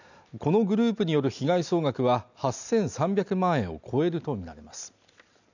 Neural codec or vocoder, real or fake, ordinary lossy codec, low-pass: none; real; none; 7.2 kHz